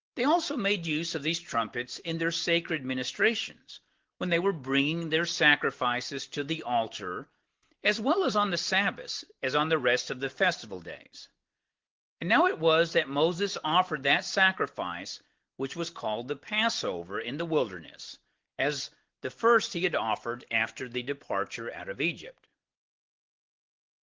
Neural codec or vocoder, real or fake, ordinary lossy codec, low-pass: none; real; Opus, 16 kbps; 7.2 kHz